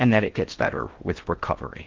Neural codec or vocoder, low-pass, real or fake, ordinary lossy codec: codec, 16 kHz in and 24 kHz out, 0.6 kbps, FocalCodec, streaming, 4096 codes; 7.2 kHz; fake; Opus, 16 kbps